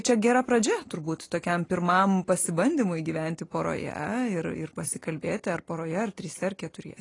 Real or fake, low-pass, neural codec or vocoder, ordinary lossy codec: real; 10.8 kHz; none; AAC, 32 kbps